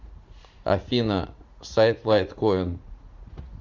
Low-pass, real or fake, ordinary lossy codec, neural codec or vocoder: 7.2 kHz; fake; AAC, 48 kbps; vocoder, 44.1 kHz, 80 mel bands, Vocos